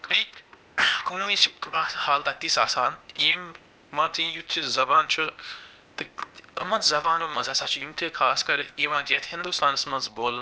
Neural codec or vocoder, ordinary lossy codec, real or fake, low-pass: codec, 16 kHz, 0.8 kbps, ZipCodec; none; fake; none